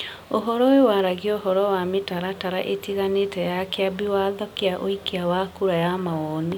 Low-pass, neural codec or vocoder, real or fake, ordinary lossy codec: 19.8 kHz; none; real; none